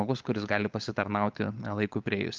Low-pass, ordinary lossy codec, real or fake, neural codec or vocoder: 7.2 kHz; Opus, 24 kbps; fake; codec, 16 kHz, 16 kbps, FunCodec, trained on LibriTTS, 50 frames a second